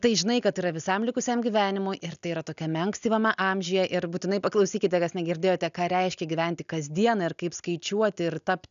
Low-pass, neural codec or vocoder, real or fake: 7.2 kHz; none; real